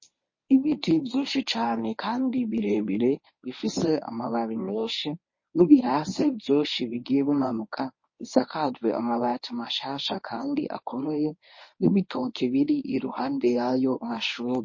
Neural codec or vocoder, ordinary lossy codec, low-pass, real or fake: codec, 24 kHz, 0.9 kbps, WavTokenizer, medium speech release version 1; MP3, 32 kbps; 7.2 kHz; fake